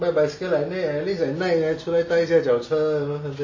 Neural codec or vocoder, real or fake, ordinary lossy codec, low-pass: none; real; MP3, 32 kbps; 7.2 kHz